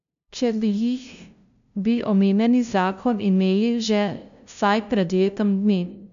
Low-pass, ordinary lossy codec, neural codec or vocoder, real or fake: 7.2 kHz; AAC, 96 kbps; codec, 16 kHz, 0.5 kbps, FunCodec, trained on LibriTTS, 25 frames a second; fake